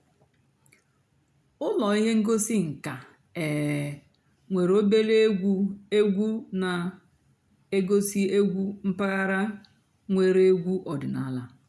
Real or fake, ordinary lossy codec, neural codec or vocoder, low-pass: real; none; none; none